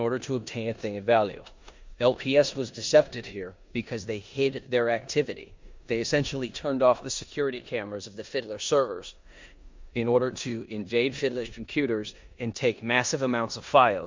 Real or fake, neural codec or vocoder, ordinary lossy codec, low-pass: fake; codec, 16 kHz in and 24 kHz out, 0.9 kbps, LongCat-Audio-Codec, four codebook decoder; MP3, 64 kbps; 7.2 kHz